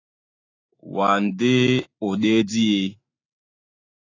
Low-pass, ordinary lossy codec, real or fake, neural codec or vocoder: 7.2 kHz; AAC, 32 kbps; fake; autoencoder, 48 kHz, 128 numbers a frame, DAC-VAE, trained on Japanese speech